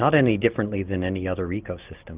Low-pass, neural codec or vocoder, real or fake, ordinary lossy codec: 3.6 kHz; none; real; Opus, 24 kbps